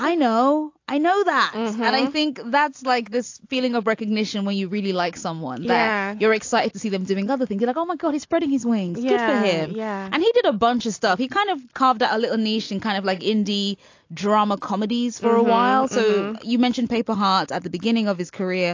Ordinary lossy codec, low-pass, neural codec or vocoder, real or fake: AAC, 48 kbps; 7.2 kHz; none; real